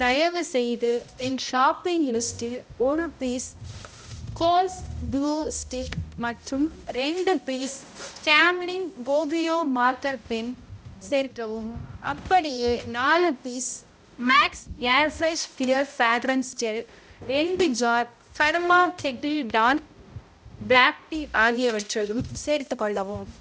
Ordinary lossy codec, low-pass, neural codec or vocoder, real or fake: none; none; codec, 16 kHz, 0.5 kbps, X-Codec, HuBERT features, trained on balanced general audio; fake